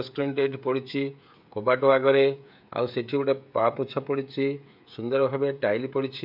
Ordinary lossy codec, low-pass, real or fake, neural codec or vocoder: MP3, 48 kbps; 5.4 kHz; fake; codec, 16 kHz, 16 kbps, FreqCodec, smaller model